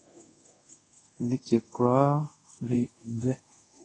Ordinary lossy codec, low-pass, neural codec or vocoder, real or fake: AAC, 32 kbps; 10.8 kHz; codec, 24 kHz, 0.5 kbps, DualCodec; fake